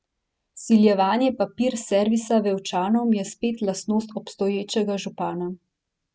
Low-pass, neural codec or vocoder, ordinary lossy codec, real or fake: none; none; none; real